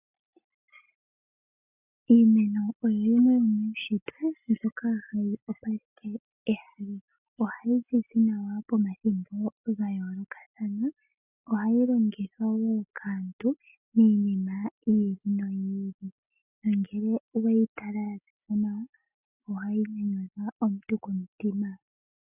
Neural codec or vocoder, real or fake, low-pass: none; real; 3.6 kHz